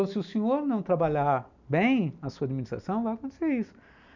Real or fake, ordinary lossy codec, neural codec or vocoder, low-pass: real; none; none; 7.2 kHz